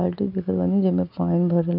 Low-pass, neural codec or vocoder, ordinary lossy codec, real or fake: 5.4 kHz; none; none; real